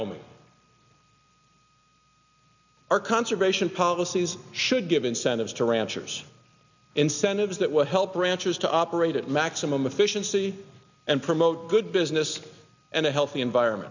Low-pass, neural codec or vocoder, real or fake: 7.2 kHz; none; real